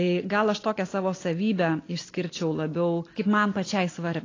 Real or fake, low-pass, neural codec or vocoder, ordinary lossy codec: real; 7.2 kHz; none; AAC, 32 kbps